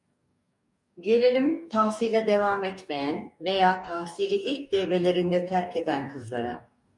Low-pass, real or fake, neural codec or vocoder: 10.8 kHz; fake; codec, 44.1 kHz, 2.6 kbps, DAC